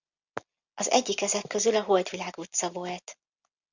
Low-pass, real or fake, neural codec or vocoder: 7.2 kHz; real; none